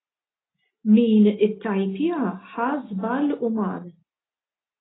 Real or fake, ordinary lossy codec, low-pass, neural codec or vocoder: real; AAC, 16 kbps; 7.2 kHz; none